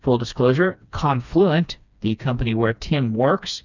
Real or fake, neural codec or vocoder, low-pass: fake; codec, 16 kHz, 2 kbps, FreqCodec, smaller model; 7.2 kHz